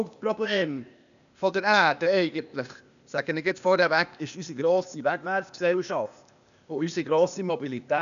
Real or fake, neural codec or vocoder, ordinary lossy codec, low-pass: fake; codec, 16 kHz, 0.8 kbps, ZipCodec; none; 7.2 kHz